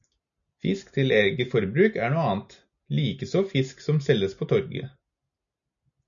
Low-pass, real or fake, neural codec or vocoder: 7.2 kHz; real; none